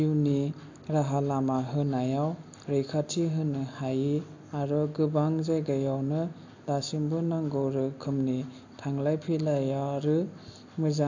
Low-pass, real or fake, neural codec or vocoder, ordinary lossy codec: 7.2 kHz; real; none; AAC, 48 kbps